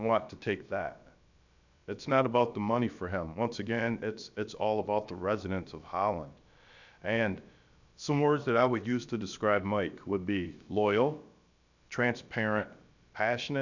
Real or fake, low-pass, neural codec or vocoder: fake; 7.2 kHz; codec, 16 kHz, about 1 kbps, DyCAST, with the encoder's durations